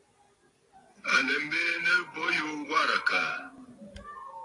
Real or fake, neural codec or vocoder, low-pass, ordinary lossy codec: real; none; 10.8 kHz; AAC, 32 kbps